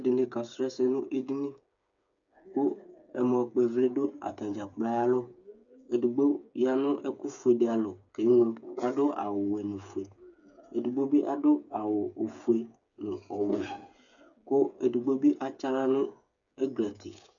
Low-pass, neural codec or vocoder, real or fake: 7.2 kHz; codec, 16 kHz, 8 kbps, FreqCodec, smaller model; fake